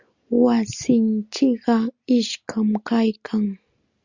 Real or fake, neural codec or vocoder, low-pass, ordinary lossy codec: real; none; 7.2 kHz; Opus, 64 kbps